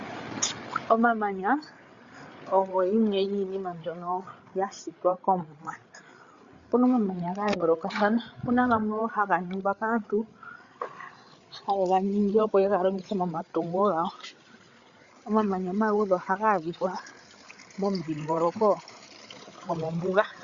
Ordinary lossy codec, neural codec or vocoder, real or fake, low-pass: Opus, 64 kbps; codec, 16 kHz, 8 kbps, FreqCodec, larger model; fake; 7.2 kHz